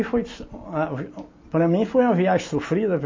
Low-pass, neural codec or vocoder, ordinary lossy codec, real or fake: 7.2 kHz; none; MP3, 32 kbps; real